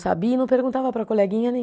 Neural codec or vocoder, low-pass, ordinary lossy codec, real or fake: none; none; none; real